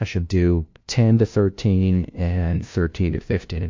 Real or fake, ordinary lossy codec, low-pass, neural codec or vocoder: fake; MP3, 48 kbps; 7.2 kHz; codec, 16 kHz, 0.5 kbps, FunCodec, trained on LibriTTS, 25 frames a second